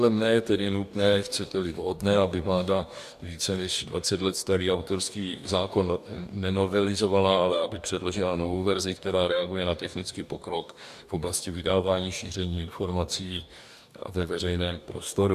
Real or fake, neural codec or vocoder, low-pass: fake; codec, 44.1 kHz, 2.6 kbps, DAC; 14.4 kHz